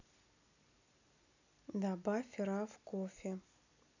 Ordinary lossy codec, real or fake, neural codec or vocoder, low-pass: none; real; none; 7.2 kHz